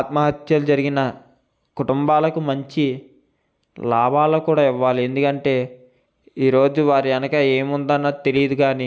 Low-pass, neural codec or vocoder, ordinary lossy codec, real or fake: none; none; none; real